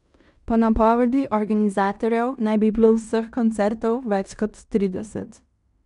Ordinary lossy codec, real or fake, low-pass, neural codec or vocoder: none; fake; 10.8 kHz; codec, 16 kHz in and 24 kHz out, 0.9 kbps, LongCat-Audio-Codec, fine tuned four codebook decoder